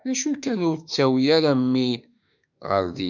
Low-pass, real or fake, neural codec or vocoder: 7.2 kHz; fake; autoencoder, 48 kHz, 32 numbers a frame, DAC-VAE, trained on Japanese speech